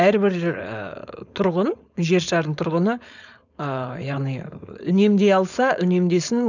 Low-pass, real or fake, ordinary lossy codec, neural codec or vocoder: 7.2 kHz; fake; none; codec, 16 kHz, 4.8 kbps, FACodec